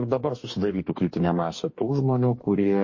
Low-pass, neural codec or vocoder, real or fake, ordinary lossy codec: 7.2 kHz; codec, 44.1 kHz, 2.6 kbps, DAC; fake; MP3, 32 kbps